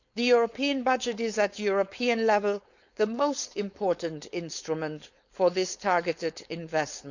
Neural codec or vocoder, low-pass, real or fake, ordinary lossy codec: codec, 16 kHz, 4.8 kbps, FACodec; 7.2 kHz; fake; none